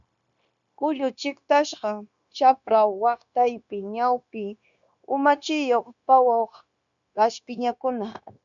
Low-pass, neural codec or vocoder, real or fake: 7.2 kHz; codec, 16 kHz, 0.9 kbps, LongCat-Audio-Codec; fake